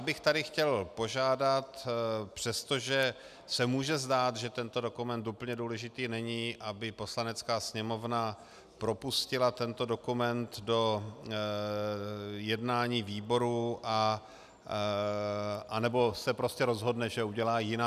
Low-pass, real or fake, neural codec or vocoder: 14.4 kHz; real; none